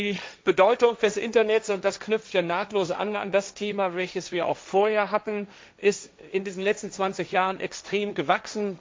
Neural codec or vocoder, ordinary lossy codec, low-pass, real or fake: codec, 16 kHz, 1.1 kbps, Voila-Tokenizer; none; none; fake